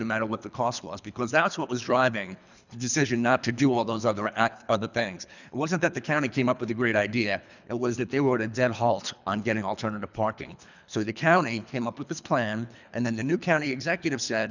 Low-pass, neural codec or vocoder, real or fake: 7.2 kHz; codec, 24 kHz, 3 kbps, HILCodec; fake